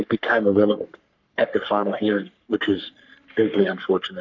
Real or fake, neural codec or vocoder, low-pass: fake; codec, 44.1 kHz, 3.4 kbps, Pupu-Codec; 7.2 kHz